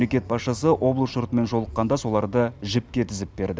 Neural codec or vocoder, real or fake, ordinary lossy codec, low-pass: none; real; none; none